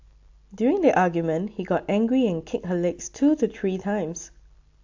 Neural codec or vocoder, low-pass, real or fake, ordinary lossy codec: none; 7.2 kHz; real; AAC, 48 kbps